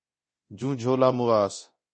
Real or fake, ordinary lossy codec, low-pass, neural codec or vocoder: fake; MP3, 32 kbps; 9.9 kHz; codec, 24 kHz, 0.9 kbps, DualCodec